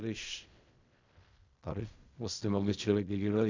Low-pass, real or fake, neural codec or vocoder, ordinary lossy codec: 7.2 kHz; fake; codec, 16 kHz in and 24 kHz out, 0.4 kbps, LongCat-Audio-Codec, fine tuned four codebook decoder; MP3, 64 kbps